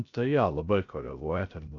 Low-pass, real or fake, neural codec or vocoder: 7.2 kHz; fake; codec, 16 kHz, 0.3 kbps, FocalCodec